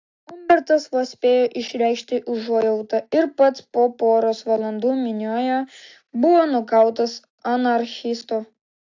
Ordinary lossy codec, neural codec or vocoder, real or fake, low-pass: AAC, 48 kbps; none; real; 7.2 kHz